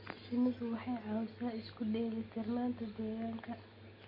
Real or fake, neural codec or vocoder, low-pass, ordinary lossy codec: real; none; 5.4 kHz; MP3, 32 kbps